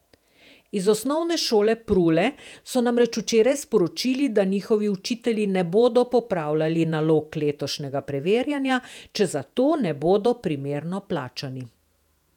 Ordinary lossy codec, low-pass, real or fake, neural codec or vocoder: none; 19.8 kHz; fake; vocoder, 48 kHz, 128 mel bands, Vocos